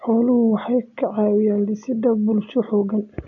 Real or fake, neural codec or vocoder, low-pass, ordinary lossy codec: real; none; 7.2 kHz; none